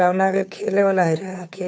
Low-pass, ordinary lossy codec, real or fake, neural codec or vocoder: none; none; fake; codec, 16 kHz, 2 kbps, FunCodec, trained on Chinese and English, 25 frames a second